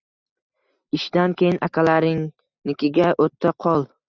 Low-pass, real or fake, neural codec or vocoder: 7.2 kHz; real; none